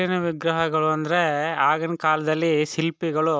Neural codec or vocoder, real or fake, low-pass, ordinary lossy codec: none; real; none; none